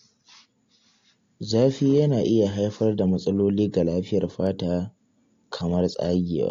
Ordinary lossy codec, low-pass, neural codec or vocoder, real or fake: AAC, 48 kbps; 7.2 kHz; none; real